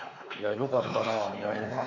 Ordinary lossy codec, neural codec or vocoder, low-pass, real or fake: none; codec, 16 kHz, 4 kbps, X-Codec, WavLM features, trained on Multilingual LibriSpeech; 7.2 kHz; fake